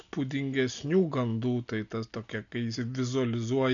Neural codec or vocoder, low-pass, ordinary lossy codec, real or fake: none; 7.2 kHz; MP3, 96 kbps; real